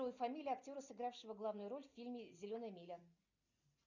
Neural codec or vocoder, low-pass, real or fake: none; 7.2 kHz; real